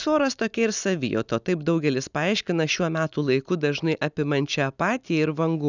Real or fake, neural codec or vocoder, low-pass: real; none; 7.2 kHz